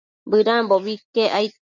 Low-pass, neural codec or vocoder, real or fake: 7.2 kHz; none; real